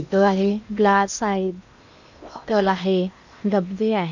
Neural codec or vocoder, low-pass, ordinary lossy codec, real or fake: codec, 16 kHz in and 24 kHz out, 0.8 kbps, FocalCodec, streaming, 65536 codes; 7.2 kHz; none; fake